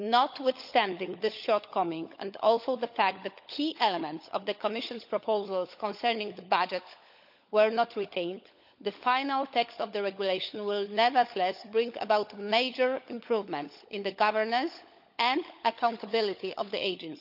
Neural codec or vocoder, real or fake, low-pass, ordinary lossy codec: codec, 16 kHz, 16 kbps, FunCodec, trained on LibriTTS, 50 frames a second; fake; 5.4 kHz; none